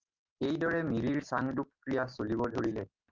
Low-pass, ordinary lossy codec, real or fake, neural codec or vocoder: 7.2 kHz; Opus, 24 kbps; real; none